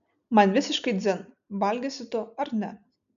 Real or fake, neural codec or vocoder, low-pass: real; none; 7.2 kHz